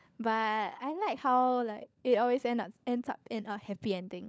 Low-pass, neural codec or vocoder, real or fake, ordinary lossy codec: none; codec, 16 kHz, 16 kbps, FunCodec, trained on LibriTTS, 50 frames a second; fake; none